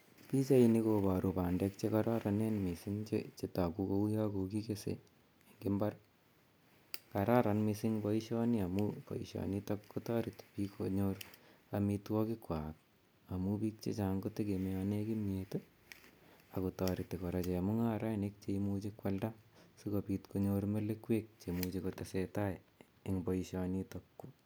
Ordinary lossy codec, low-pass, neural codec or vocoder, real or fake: none; none; none; real